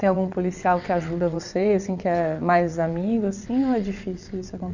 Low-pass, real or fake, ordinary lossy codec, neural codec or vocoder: 7.2 kHz; fake; none; vocoder, 22.05 kHz, 80 mel bands, WaveNeXt